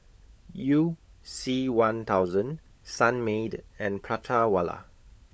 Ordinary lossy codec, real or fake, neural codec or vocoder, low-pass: none; fake; codec, 16 kHz, 16 kbps, FunCodec, trained on LibriTTS, 50 frames a second; none